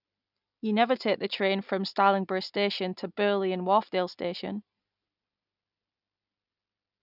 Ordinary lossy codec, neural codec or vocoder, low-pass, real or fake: none; none; 5.4 kHz; real